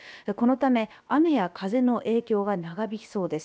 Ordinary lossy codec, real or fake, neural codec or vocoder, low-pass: none; fake; codec, 16 kHz, about 1 kbps, DyCAST, with the encoder's durations; none